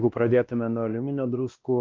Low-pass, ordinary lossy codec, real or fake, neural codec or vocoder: 7.2 kHz; Opus, 32 kbps; fake; codec, 16 kHz, 1 kbps, X-Codec, WavLM features, trained on Multilingual LibriSpeech